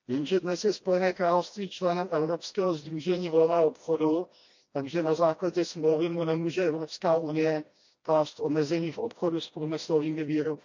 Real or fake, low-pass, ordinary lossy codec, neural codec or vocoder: fake; 7.2 kHz; MP3, 48 kbps; codec, 16 kHz, 1 kbps, FreqCodec, smaller model